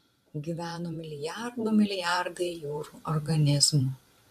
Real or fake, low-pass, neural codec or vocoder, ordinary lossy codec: fake; 14.4 kHz; vocoder, 44.1 kHz, 128 mel bands every 512 samples, BigVGAN v2; MP3, 96 kbps